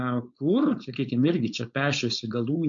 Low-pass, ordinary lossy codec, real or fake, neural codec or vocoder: 7.2 kHz; MP3, 48 kbps; fake; codec, 16 kHz, 4.8 kbps, FACodec